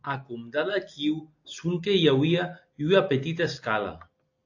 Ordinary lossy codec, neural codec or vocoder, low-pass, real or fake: AAC, 48 kbps; none; 7.2 kHz; real